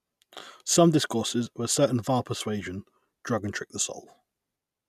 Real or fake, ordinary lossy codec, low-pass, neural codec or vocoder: real; none; 14.4 kHz; none